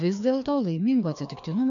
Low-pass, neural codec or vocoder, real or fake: 7.2 kHz; codec, 16 kHz, 2 kbps, FreqCodec, larger model; fake